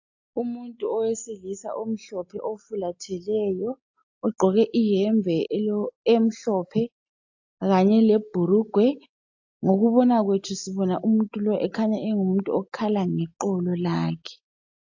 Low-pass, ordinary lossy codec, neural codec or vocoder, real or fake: 7.2 kHz; AAC, 48 kbps; none; real